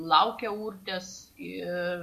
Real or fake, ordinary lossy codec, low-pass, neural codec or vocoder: real; MP3, 64 kbps; 14.4 kHz; none